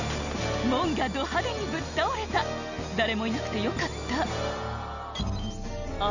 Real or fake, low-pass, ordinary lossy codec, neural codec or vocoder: real; 7.2 kHz; none; none